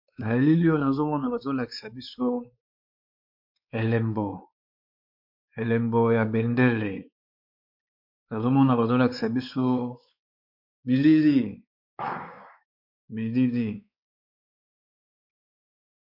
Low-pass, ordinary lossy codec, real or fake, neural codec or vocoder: 5.4 kHz; MP3, 48 kbps; fake; codec, 16 kHz, 4 kbps, X-Codec, WavLM features, trained on Multilingual LibriSpeech